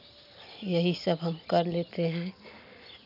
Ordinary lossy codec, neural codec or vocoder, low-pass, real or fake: none; none; 5.4 kHz; real